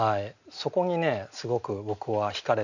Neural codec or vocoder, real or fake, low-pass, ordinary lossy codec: none; real; 7.2 kHz; none